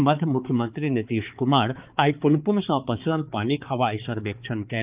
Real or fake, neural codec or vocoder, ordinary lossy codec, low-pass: fake; codec, 16 kHz, 4 kbps, X-Codec, HuBERT features, trained on balanced general audio; Opus, 24 kbps; 3.6 kHz